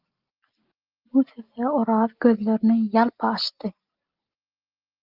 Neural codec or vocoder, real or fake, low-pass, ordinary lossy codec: none; real; 5.4 kHz; Opus, 24 kbps